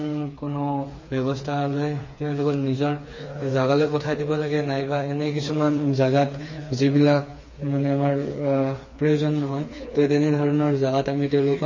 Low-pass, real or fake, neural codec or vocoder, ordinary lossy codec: 7.2 kHz; fake; codec, 16 kHz, 4 kbps, FreqCodec, smaller model; MP3, 32 kbps